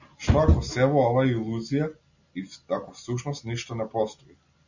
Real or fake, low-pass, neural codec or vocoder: real; 7.2 kHz; none